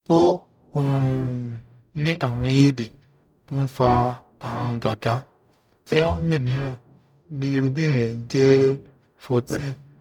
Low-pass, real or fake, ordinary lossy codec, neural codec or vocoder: 19.8 kHz; fake; none; codec, 44.1 kHz, 0.9 kbps, DAC